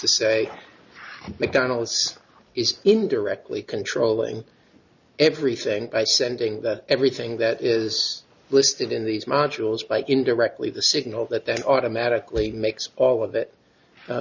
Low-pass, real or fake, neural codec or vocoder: 7.2 kHz; real; none